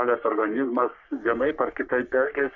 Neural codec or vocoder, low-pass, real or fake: codec, 44.1 kHz, 3.4 kbps, Pupu-Codec; 7.2 kHz; fake